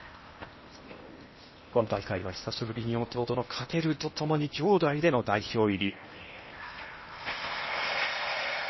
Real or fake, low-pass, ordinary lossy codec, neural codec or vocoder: fake; 7.2 kHz; MP3, 24 kbps; codec, 16 kHz in and 24 kHz out, 0.8 kbps, FocalCodec, streaming, 65536 codes